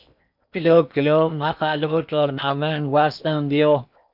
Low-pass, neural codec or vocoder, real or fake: 5.4 kHz; codec, 16 kHz in and 24 kHz out, 0.8 kbps, FocalCodec, streaming, 65536 codes; fake